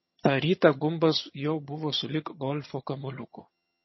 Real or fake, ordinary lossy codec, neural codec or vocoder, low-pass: fake; MP3, 24 kbps; vocoder, 22.05 kHz, 80 mel bands, HiFi-GAN; 7.2 kHz